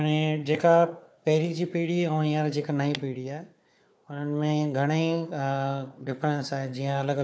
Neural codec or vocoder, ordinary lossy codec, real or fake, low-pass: codec, 16 kHz, 4 kbps, FunCodec, trained on Chinese and English, 50 frames a second; none; fake; none